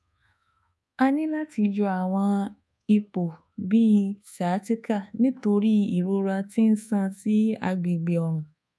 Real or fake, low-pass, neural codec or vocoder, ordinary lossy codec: fake; none; codec, 24 kHz, 1.2 kbps, DualCodec; none